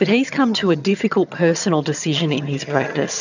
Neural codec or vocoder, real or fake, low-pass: vocoder, 22.05 kHz, 80 mel bands, HiFi-GAN; fake; 7.2 kHz